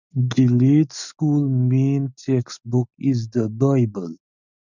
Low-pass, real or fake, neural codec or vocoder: 7.2 kHz; real; none